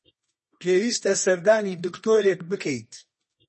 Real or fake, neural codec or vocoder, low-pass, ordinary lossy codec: fake; codec, 24 kHz, 0.9 kbps, WavTokenizer, medium music audio release; 10.8 kHz; MP3, 32 kbps